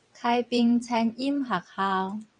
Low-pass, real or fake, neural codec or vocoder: 9.9 kHz; fake; vocoder, 22.05 kHz, 80 mel bands, WaveNeXt